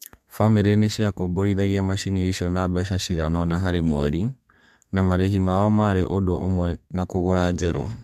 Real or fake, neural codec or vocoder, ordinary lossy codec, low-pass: fake; codec, 32 kHz, 1.9 kbps, SNAC; MP3, 96 kbps; 14.4 kHz